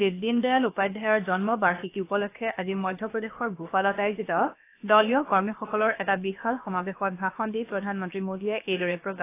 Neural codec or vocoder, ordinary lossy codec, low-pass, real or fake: codec, 16 kHz, about 1 kbps, DyCAST, with the encoder's durations; AAC, 24 kbps; 3.6 kHz; fake